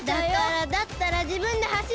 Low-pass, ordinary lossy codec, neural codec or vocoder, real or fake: none; none; none; real